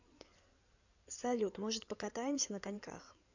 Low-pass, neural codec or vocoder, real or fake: 7.2 kHz; codec, 16 kHz in and 24 kHz out, 2.2 kbps, FireRedTTS-2 codec; fake